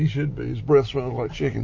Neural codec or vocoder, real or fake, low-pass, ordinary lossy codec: none; real; 7.2 kHz; MP3, 32 kbps